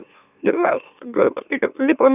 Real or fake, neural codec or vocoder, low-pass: fake; autoencoder, 44.1 kHz, a latent of 192 numbers a frame, MeloTTS; 3.6 kHz